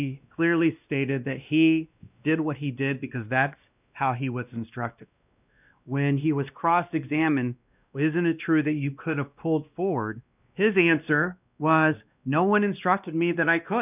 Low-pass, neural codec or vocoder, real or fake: 3.6 kHz; codec, 16 kHz, 1 kbps, X-Codec, WavLM features, trained on Multilingual LibriSpeech; fake